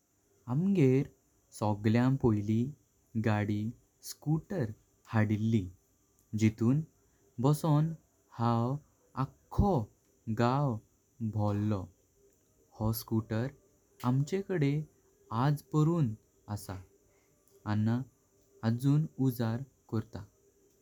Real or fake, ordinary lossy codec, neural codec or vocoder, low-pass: real; none; none; 19.8 kHz